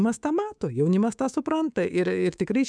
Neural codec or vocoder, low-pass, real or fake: codec, 24 kHz, 3.1 kbps, DualCodec; 9.9 kHz; fake